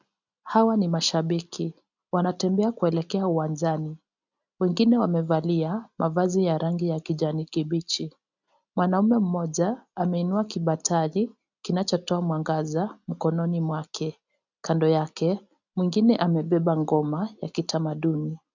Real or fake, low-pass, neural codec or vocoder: real; 7.2 kHz; none